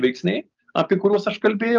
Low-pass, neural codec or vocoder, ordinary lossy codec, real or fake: 7.2 kHz; none; Opus, 24 kbps; real